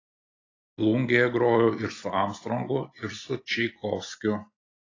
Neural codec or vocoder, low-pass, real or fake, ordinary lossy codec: none; 7.2 kHz; real; AAC, 32 kbps